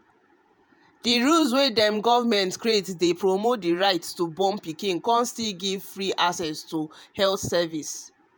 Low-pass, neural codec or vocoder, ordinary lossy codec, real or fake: none; vocoder, 48 kHz, 128 mel bands, Vocos; none; fake